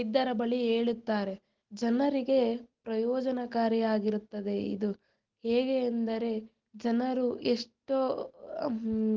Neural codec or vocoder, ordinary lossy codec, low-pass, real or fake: none; Opus, 16 kbps; 7.2 kHz; real